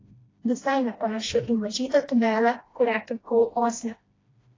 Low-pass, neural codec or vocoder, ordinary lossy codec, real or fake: 7.2 kHz; codec, 16 kHz, 1 kbps, FreqCodec, smaller model; AAC, 32 kbps; fake